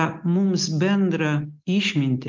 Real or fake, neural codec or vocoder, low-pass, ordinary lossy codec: real; none; 7.2 kHz; Opus, 24 kbps